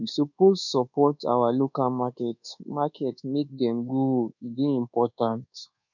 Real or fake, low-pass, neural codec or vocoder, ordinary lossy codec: fake; 7.2 kHz; codec, 24 kHz, 1.2 kbps, DualCodec; none